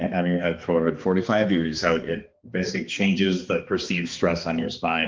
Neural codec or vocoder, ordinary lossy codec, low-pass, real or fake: codec, 16 kHz, 2 kbps, X-Codec, HuBERT features, trained on balanced general audio; Opus, 32 kbps; 7.2 kHz; fake